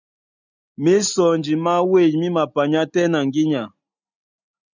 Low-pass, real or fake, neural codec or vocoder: 7.2 kHz; real; none